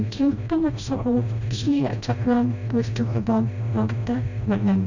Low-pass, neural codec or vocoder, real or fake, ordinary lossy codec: 7.2 kHz; codec, 16 kHz, 0.5 kbps, FreqCodec, smaller model; fake; none